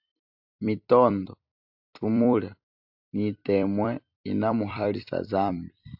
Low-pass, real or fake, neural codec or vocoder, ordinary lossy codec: 5.4 kHz; fake; vocoder, 44.1 kHz, 128 mel bands every 256 samples, BigVGAN v2; AAC, 48 kbps